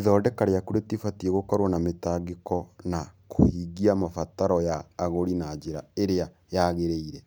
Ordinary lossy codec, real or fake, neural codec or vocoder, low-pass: none; real; none; none